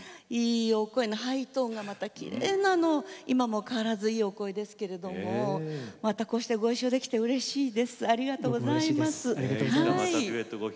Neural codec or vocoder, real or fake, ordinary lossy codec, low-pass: none; real; none; none